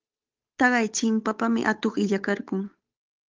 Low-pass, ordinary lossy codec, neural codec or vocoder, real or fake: 7.2 kHz; Opus, 32 kbps; codec, 16 kHz, 8 kbps, FunCodec, trained on Chinese and English, 25 frames a second; fake